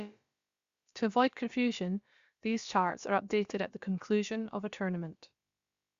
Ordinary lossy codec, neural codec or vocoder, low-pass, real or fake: Opus, 64 kbps; codec, 16 kHz, about 1 kbps, DyCAST, with the encoder's durations; 7.2 kHz; fake